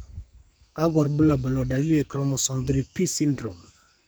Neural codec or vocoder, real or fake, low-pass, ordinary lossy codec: codec, 44.1 kHz, 2.6 kbps, SNAC; fake; none; none